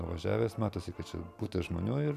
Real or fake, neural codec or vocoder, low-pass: real; none; 14.4 kHz